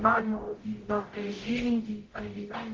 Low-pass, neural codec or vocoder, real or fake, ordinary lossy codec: 7.2 kHz; codec, 44.1 kHz, 0.9 kbps, DAC; fake; Opus, 16 kbps